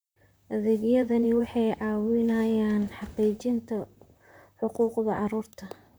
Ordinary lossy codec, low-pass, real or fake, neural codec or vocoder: none; none; fake; vocoder, 44.1 kHz, 128 mel bands, Pupu-Vocoder